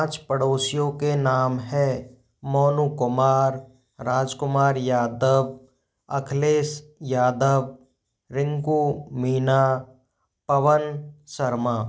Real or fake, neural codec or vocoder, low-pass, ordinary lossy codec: real; none; none; none